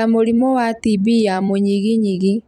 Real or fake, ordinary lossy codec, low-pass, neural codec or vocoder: fake; none; 19.8 kHz; vocoder, 44.1 kHz, 128 mel bands every 256 samples, BigVGAN v2